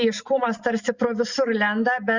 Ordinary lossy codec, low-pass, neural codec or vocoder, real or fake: Opus, 64 kbps; 7.2 kHz; none; real